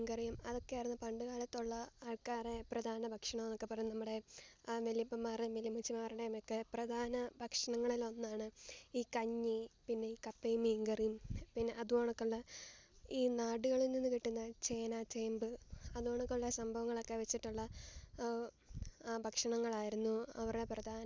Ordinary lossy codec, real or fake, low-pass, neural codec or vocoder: none; real; none; none